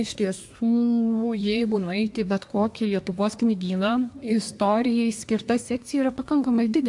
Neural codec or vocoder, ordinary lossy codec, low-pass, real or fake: codec, 24 kHz, 1 kbps, SNAC; AAC, 64 kbps; 10.8 kHz; fake